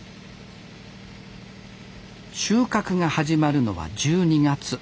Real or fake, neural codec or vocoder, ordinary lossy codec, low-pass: real; none; none; none